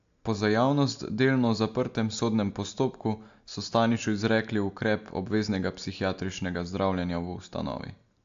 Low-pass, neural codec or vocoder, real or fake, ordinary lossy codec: 7.2 kHz; none; real; AAC, 64 kbps